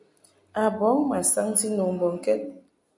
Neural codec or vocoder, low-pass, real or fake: none; 10.8 kHz; real